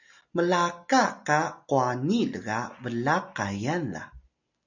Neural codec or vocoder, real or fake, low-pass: none; real; 7.2 kHz